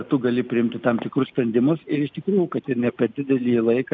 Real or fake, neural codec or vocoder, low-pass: real; none; 7.2 kHz